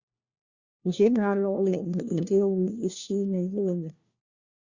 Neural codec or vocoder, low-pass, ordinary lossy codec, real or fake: codec, 16 kHz, 1 kbps, FunCodec, trained on LibriTTS, 50 frames a second; 7.2 kHz; Opus, 64 kbps; fake